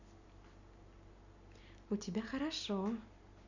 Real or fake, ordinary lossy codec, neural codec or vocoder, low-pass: real; none; none; 7.2 kHz